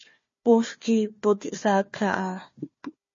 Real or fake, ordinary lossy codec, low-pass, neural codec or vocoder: fake; MP3, 32 kbps; 7.2 kHz; codec, 16 kHz, 1 kbps, FunCodec, trained on Chinese and English, 50 frames a second